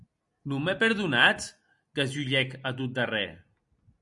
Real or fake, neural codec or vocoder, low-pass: real; none; 10.8 kHz